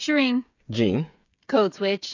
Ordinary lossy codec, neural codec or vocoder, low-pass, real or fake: AAC, 48 kbps; codec, 16 kHz, 8 kbps, FreqCodec, smaller model; 7.2 kHz; fake